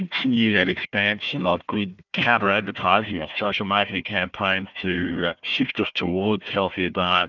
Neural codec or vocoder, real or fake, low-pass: codec, 16 kHz, 1 kbps, FunCodec, trained on Chinese and English, 50 frames a second; fake; 7.2 kHz